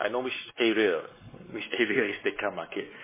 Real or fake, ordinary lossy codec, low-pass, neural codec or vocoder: fake; MP3, 16 kbps; 3.6 kHz; codec, 16 kHz, 4 kbps, X-Codec, WavLM features, trained on Multilingual LibriSpeech